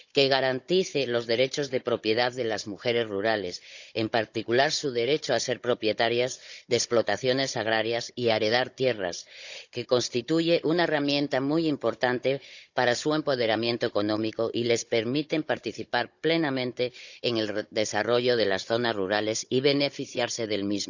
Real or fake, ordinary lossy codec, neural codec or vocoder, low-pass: fake; none; codec, 16 kHz, 16 kbps, FunCodec, trained on Chinese and English, 50 frames a second; 7.2 kHz